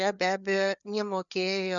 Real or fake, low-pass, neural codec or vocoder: fake; 7.2 kHz; codec, 16 kHz, 4 kbps, FreqCodec, larger model